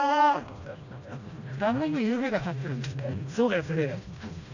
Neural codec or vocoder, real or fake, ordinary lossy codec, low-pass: codec, 16 kHz, 1 kbps, FreqCodec, smaller model; fake; none; 7.2 kHz